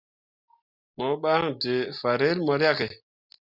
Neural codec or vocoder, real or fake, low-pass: none; real; 5.4 kHz